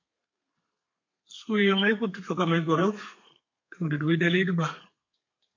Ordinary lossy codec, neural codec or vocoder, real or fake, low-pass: MP3, 48 kbps; codec, 32 kHz, 1.9 kbps, SNAC; fake; 7.2 kHz